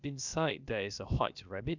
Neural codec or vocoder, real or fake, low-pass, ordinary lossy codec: codec, 16 kHz, about 1 kbps, DyCAST, with the encoder's durations; fake; 7.2 kHz; none